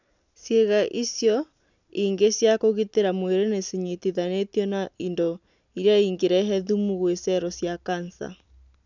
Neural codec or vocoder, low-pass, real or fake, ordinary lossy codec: none; 7.2 kHz; real; none